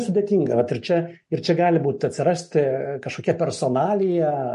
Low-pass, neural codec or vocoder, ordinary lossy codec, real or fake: 14.4 kHz; none; MP3, 48 kbps; real